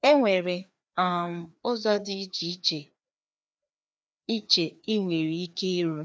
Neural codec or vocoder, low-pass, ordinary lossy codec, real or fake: codec, 16 kHz, 2 kbps, FreqCodec, larger model; none; none; fake